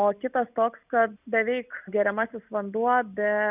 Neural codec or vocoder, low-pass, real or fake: none; 3.6 kHz; real